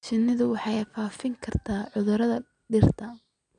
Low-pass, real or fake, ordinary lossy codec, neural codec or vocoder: 9.9 kHz; real; MP3, 96 kbps; none